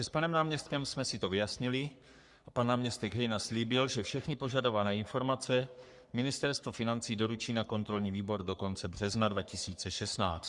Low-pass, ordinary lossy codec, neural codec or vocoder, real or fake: 10.8 kHz; Opus, 64 kbps; codec, 44.1 kHz, 3.4 kbps, Pupu-Codec; fake